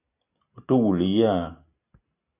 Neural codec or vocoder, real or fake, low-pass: none; real; 3.6 kHz